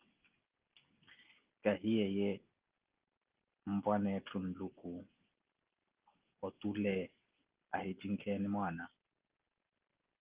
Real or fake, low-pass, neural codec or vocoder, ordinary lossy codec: real; 3.6 kHz; none; Opus, 32 kbps